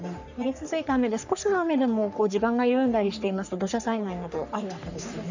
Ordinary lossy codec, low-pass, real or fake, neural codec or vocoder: none; 7.2 kHz; fake; codec, 44.1 kHz, 3.4 kbps, Pupu-Codec